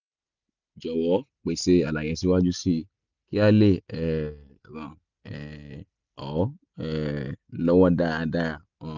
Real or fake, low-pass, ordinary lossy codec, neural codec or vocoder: real; 7.2 kHz; none; none